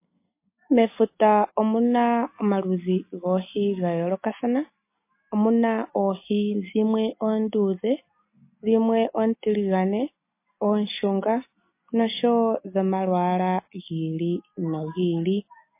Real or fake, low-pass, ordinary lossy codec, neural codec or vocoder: real; 3.6 kHz; MP3, 24 kbps; none